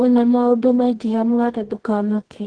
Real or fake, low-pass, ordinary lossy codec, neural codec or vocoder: fake; 9.9 kHz; Opus, 16 kbps; codec, 24 kHz, 0.9 kbps, WavTokenizer, medium music audio release